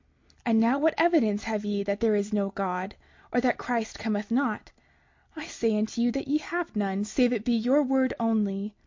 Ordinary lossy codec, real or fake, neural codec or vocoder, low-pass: MP3, 48 kbps; real; none; 7.2 kHz